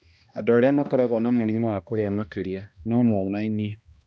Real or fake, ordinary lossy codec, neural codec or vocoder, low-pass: fake; none; codec, 16 kHz, 1 kbps, X-Codec, HuBERT features, trained on balanced general audio; none